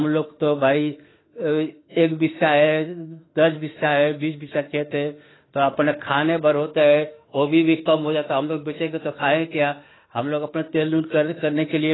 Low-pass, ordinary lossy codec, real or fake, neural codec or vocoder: 7.2 kHz; AAC, 16 kbps; fake; autoencoder, 48 kHz, 32 numbers a frame, DAC-VAE, trained on Japanese speech